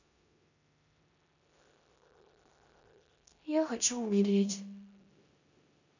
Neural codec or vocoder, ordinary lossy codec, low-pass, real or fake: codec, 16 kHz in and 24 kHz out, 0.9 kbps, LongCat-Audio-Codec, four codebook decoder; none; 7.2 kHz; fake